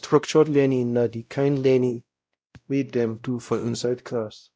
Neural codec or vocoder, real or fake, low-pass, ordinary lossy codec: codec, 16 kHz, 0.5 kbps, X-Codec, WavLM features, trained on Multilingual LibriSpeech; fake; none; none